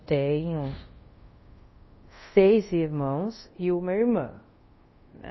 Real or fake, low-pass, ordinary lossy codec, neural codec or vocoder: fake; 7.2 kHz; MP3, 24 kbps; codec, 24 kHz, 0.5 kbps, DualCodec